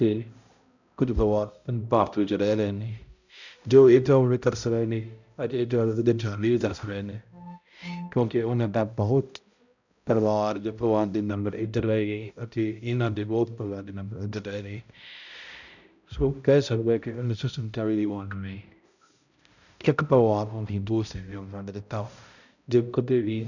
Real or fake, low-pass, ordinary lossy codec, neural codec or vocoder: fake; 7.2 kHz; none; codec, 16 kHz, 0.5 kbps, X-Codec, HuBERT features, trained on balanced general audio